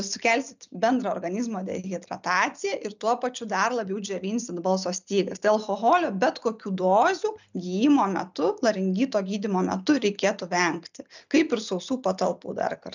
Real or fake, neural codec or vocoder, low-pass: real; none; 7.2 kHz